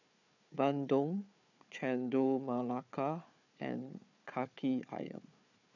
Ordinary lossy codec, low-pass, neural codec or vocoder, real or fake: none; 7.2 kHz; codec, 16 kHz, 4 kbps, FunCodec, trained on Chinese and English, 50 frames a second; fake